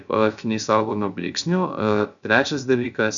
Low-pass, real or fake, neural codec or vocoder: 7.2 kHz; fake; codec, 16 kHz, 0.3 kbps, FocalCodec